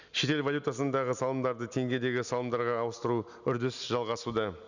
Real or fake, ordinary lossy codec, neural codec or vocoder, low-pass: real; none; none; 7.2 kHz